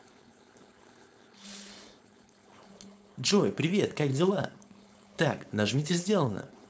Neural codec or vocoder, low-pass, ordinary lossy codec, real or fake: codec, 16 kHz, 4.8 kbps, FACodec; none; none; fake